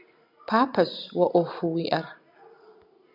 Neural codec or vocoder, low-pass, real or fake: none; 5.4 kHz; real